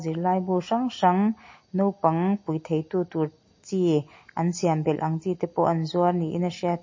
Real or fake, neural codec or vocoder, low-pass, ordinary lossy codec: real; none; 7.2 kHz; MP3, 32 kbps